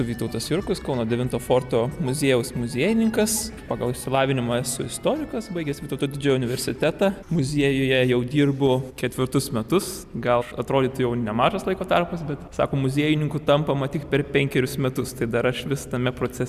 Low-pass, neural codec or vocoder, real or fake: 14.4 kHz; none; real